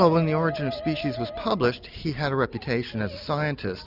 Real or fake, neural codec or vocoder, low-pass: real; none; 5.4 kHz